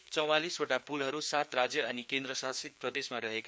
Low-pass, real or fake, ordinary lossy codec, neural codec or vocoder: none; fake; none; codec, 16 kHz, 2 kbps, FreqCodec, larger model